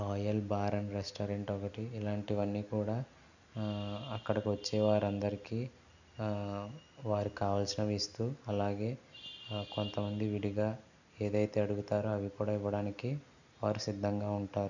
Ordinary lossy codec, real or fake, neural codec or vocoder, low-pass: none; real; none; 7.2 kHz